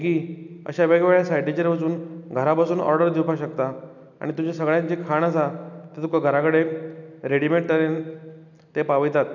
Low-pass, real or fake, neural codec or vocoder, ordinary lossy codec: 7.2 kHz; real; none; none